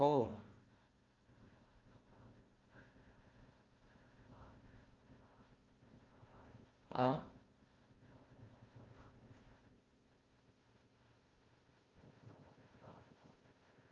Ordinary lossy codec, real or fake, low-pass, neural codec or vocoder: Opus, 32 kbps; fake; 7.2 kHz; codec, 16 kHz, 1 kbps, FunCodec, trained on Chinese and English, 50 frames a second